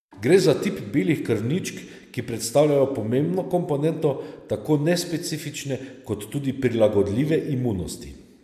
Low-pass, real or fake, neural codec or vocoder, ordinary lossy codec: 14.4 kHz; real; none; MP3, 96 kbps